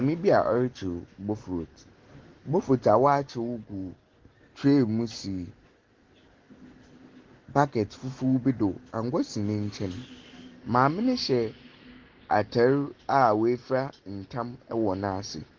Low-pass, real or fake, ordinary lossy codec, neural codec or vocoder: 7.2 kHz; real; Opus, 16 kbps; none